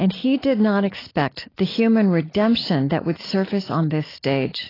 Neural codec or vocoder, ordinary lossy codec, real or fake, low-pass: none; AAC, 24 kbps; real; 5.4 kHz